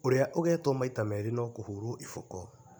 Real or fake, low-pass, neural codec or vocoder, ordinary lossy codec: real; none; none; none